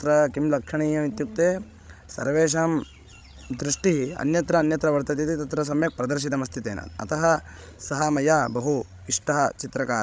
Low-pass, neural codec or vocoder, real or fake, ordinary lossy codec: none; codec, 16 kHz, 16 kbps, FreqCodec, larger model; fake; none